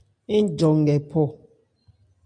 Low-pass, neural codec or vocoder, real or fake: 9.9 kHz; none; real